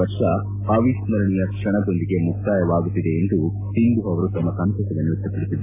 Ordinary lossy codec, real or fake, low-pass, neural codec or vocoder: AAC, 24 kbps; real; 3.6 kHz; none